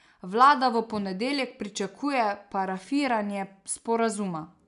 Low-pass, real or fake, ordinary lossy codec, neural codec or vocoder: 10.8 kHz; real; none; none